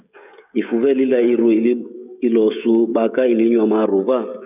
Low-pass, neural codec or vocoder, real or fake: 3.6 kHz; codec, 16 kHz, 16 kbps, FreqCodec, smaller model; fake